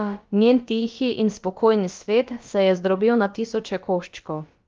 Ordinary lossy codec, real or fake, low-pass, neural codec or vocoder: Opus, 32 kbps; fake; 7.2 kHz; codec, 16 kHz, about 1 kbps, DyCAST, with the encoder's durations